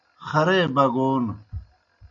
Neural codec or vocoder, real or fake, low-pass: none; real; 7.2 kHz